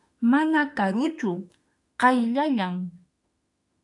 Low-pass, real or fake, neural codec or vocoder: 10.8 kHz; fake; autoencoder, 48 kHz, 32 numbers a frame, DAC-VAE, trained on Japanese speech